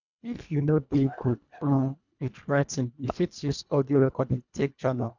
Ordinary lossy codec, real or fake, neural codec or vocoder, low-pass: none; fake; codec, 24 kHz, 1.5 kbps, HILCodec; 7.2 kHz